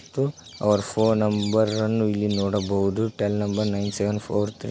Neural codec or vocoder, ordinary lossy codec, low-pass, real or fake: none; none; none; real